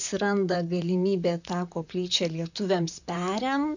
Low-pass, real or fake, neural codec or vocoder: 7.2 kHz; fake; vocoder, 44.1 kHz, 128 mel bands, Pupu-Vocoder